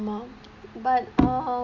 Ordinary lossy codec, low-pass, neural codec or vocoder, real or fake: Opus, 64 kbps; 7.2 kHz; none; real